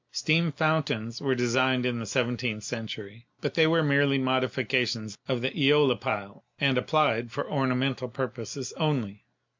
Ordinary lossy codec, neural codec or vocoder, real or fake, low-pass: MP3, 64 kbps; none; real; 7.2 kHz